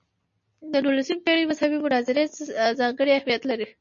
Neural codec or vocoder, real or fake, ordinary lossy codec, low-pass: none; real; MP3, 32 kbps; 7.2 kHz